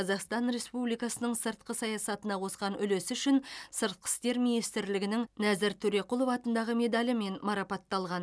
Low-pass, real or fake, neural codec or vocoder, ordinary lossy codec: none; real; none; none